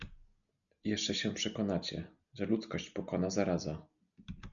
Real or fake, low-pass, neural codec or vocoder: real; 7.2 kHz; none